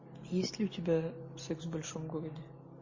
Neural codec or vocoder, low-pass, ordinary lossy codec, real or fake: none; 7.2 kHz; MP3, 32 kbps; real